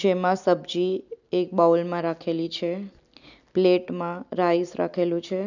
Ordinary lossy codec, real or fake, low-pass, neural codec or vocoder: none; real; 7.2 kHz; none